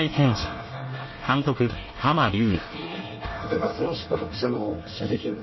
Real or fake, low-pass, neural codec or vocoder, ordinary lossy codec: fake; 7.2 kHz; codec, 24 kHz, 1 kbps, SNAC; MP3, 24 kbps